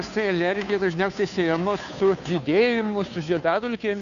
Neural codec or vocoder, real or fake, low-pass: codec, 16 kHz, 2 kbps, FunCodec, trained on Chinese and English, 25 frames a second; fake; 7.2 kHz